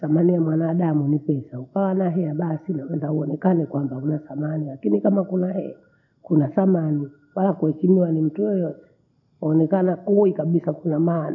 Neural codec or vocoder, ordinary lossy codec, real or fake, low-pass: none; none; real; 7.2 kHz